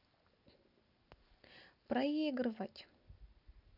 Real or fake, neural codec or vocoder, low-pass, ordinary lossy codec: real; none; 5.4 kHz; none